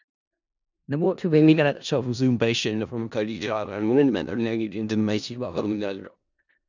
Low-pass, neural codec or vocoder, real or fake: 7.2 kHz; codec, 16 kHz in and 24 kHz out, 0.4 kbps, LongCat-Audio-Codec, four codebook decoder; fake